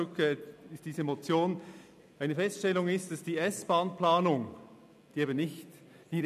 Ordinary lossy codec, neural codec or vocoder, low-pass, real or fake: none; none; 14.4 kHz; real